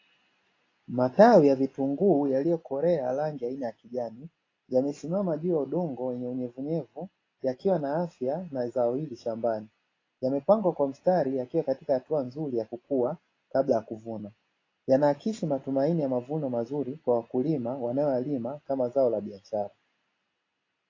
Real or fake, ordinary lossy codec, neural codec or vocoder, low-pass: real; AAC, 32 kbps; none; 7.2 kHz